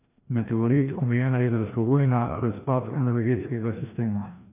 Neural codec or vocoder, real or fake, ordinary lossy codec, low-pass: codec, 16 kHz, 1 kbps, FreqCodec, larger model; fake; MP3, 32 kbps; 3.6 kHz